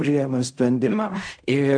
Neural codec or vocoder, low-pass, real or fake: codec, 16 kHz in and 24 kHz out, 0.4 kbps, LongCat-Audio-Codec, fine tuned four codebook decoder; 9.9 kHz; fake